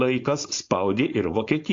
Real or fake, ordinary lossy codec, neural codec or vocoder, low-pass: fake; AAC, 48 kbps; codec, 16 kHz, 4.8 kbps, FACodec; 7.2 kHz